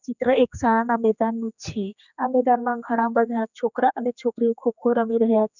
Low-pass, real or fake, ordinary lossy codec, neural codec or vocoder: 7.2 kHz; fake; none; codec, 16 kHz, 2 kbps, X-Codec, HuBERT features, trained on general audio